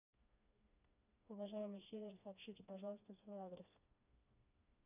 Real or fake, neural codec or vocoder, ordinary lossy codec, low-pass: fake; codec, 16 kHz, 2 kbps, FreqCodec, smaller model; none; 3.6 kHz